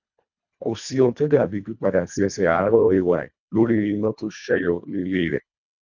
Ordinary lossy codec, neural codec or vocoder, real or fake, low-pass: none; codec, 24 kHz, 1.5 kbps, HILCodec; fake; 7.2 kHz